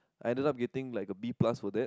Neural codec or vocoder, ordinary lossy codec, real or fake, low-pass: none; none; real; none